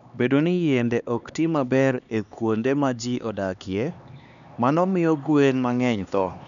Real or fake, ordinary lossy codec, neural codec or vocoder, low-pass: fake; none; codec, 16 kHz, 2 kbps, X-Codec, HuBERT features, trained on LibriSpeech; 7.2 kHz